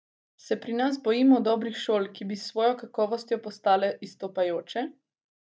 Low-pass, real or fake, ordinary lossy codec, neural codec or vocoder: none; real; none; none